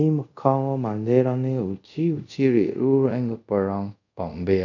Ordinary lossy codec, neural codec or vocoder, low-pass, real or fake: none; codec, 24 kHz, 0.5 kbps, DualCodec; 7.2 kHz; fake